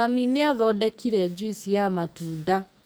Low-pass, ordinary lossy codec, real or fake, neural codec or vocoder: none; none; fake; codec, 44.1 kHz, 2.6 kbps, SNAC